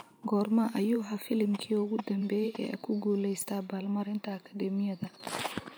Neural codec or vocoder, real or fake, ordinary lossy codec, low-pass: vocoder, 44.1 kHz, 128 mel bands every 512 samples, BigVGAN v2; fake; none; none